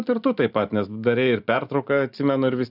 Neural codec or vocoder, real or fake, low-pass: none; real; 5.4 kHz